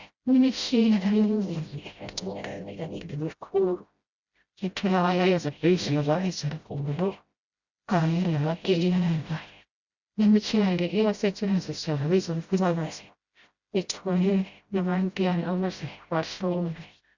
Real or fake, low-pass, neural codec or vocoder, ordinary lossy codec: fake; 7.2 kHz; codec, 16 kHz, 0.5 kbps, FreqCodec, smaller model; Opus, 64 kbps